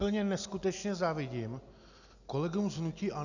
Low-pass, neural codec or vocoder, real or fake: 7.2 kHz; none; real